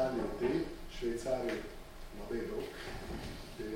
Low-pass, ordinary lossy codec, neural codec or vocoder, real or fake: 19.8 kHz; MP3, 64 kbps; none; real